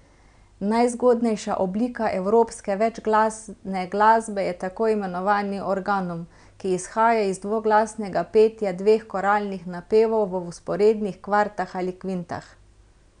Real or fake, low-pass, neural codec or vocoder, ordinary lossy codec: real; 9.9 kHz; none; none